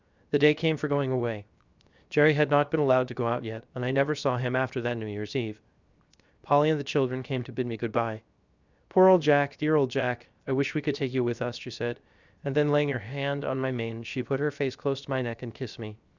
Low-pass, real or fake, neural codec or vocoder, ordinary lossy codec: 7.2 kHz; fake; codec, 16 kHz, 0.7 kbps, FocalCodec; Opus, 64 kbps